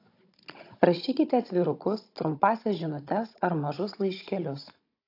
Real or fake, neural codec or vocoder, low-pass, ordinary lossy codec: fake; codec, 16 kHz, 16 kbps, FunCodec, trained on Chinese and English, 50 frames a second; 5.4 kHz; AAC, 32 kbps